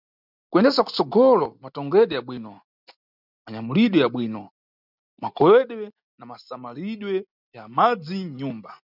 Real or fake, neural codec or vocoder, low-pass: real; none; 5.4 kHz